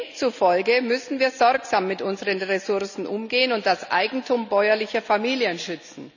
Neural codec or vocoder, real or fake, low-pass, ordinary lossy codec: none; real; 7.2 kHz; none